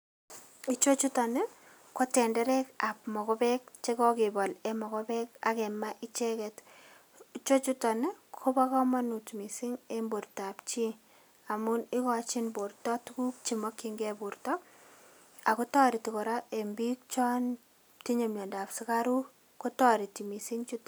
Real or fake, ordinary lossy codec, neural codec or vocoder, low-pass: real; none; none; none